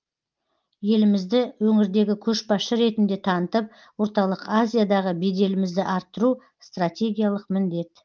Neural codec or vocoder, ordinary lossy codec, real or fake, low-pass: none; Opus, 32 kbps; real; 7.2 kHz